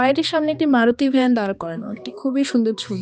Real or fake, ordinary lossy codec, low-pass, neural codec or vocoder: fake; none; none; codec, 16 kHz, 2 kbps, X-Codec, HuBERT features, trained on balanced general audio